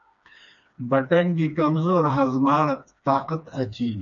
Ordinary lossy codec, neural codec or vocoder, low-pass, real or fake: MP3, 96 kbps; codec, 16 kHz, 2 kbps, FreqCodec, smaller model; 7.2 kHz; fake